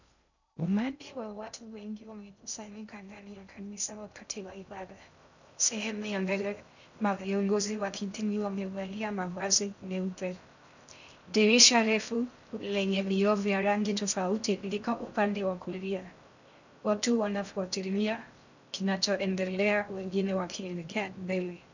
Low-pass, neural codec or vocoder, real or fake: 7.2 kHz; codec, 16 kHz in and 24 kHz out, 0.6 kbps, FocalCodec, streaming, 2048 codes; fake